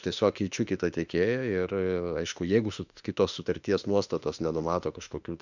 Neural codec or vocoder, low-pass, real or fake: autoencoder, 48 kHz, 32 numbers a frame, DAC-VAE, trained on Japanese speech; 7.2 kHz; fake